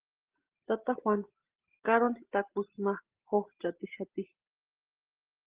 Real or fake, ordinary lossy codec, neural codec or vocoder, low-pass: real; Opus, 16 kbps; none; 3.6 kHz